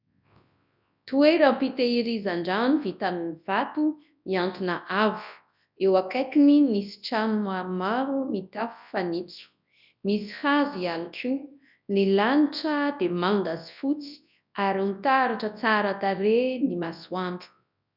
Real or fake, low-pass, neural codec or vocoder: fake; 5.4 kHz; codec, 24 kHz, 0.9 kbps, WavTokenizer, large speech release